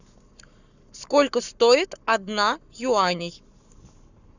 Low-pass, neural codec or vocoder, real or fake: 7.2 kHz; codec, 44.1 kHz, 7.8 kbps, Pupu-Codec; fake